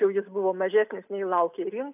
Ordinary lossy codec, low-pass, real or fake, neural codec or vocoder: AAC, 32 kbps; 3.6 kHz; real; none